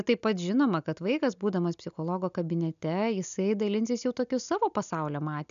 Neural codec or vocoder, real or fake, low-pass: none; real; 7.2 kHz